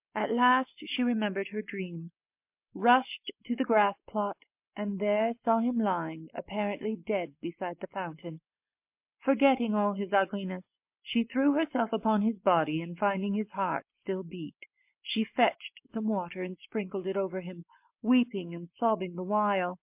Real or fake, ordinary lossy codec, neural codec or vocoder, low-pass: real; MP3, 32 kbps; none; 3.6 kHz